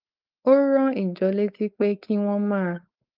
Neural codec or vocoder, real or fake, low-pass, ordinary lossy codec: codec, 16 kHz, 4.8 kbps, FACodec; fake; 5.4 kHz; Opus, 24 kbps